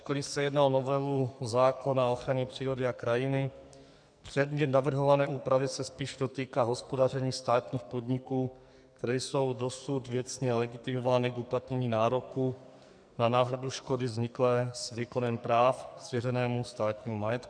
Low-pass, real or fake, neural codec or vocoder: 9.9 kHz; fake; codec, 44.1 kHz, 2.6 kbps, SNAC